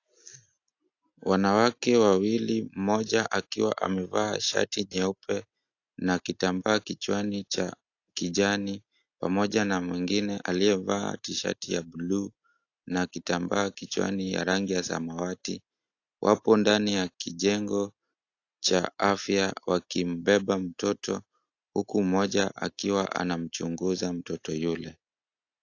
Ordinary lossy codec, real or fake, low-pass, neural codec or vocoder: AAC, 48 kbps; real; 7.2 kHz; none